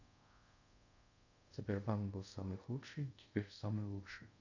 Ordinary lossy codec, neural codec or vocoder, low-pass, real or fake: none; codec, 24 kHz, 0.5 kbps, DualCodec; 7.2 kHz; fake